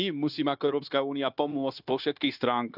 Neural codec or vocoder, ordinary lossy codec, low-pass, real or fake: codec, 16 kHz, 0.9 kbps, LongCat-Audio-Codec; none; 5.4 kHz; fake